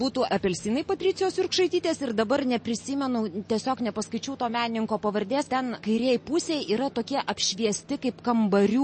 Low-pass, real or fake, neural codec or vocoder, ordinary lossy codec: 9.9 kHz; real; none; MP3, 32 kbps